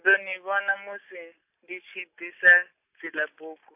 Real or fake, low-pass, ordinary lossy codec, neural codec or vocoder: real; 3.6 kHz; AAC, 32 kbps; none